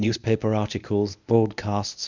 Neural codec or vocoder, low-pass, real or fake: codec, 24 kHz, 0.9 kbps, WavTokenizer, medium speech release version 1; 7.2 kHz; fake